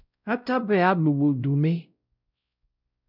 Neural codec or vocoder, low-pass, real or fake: codec, 16 kHz, 0.5 kbps, X-Codec, WavLM features, trained on Multilingual LibriSpeech; 5.4 kHz; fake